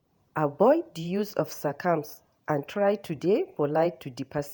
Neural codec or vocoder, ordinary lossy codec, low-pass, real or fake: vocoder, 48 kHz, 128 mel bands, Vocos; none; none; fake